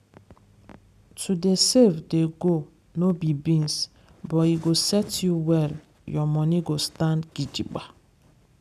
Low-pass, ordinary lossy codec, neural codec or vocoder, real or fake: 14.4 kHz; none; none; real